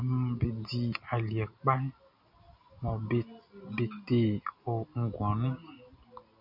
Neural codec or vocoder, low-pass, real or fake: none; 5.4 kHz; real